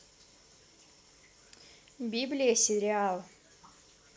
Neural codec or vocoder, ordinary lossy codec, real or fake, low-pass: none; none; real; none